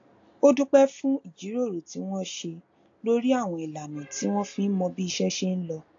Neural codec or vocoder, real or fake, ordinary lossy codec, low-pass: none; real; AAC, 48 kbps; 7.2 kHz